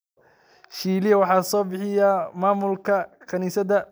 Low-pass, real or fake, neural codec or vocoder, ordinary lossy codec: none; real; none; none